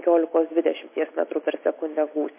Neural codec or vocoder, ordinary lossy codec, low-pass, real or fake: none; MP3, 24 kbps; 3.6 kHz; real